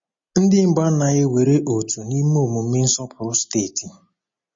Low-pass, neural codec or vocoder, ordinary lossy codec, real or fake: 7.2 kHz; none; MP3, 32 kbps; real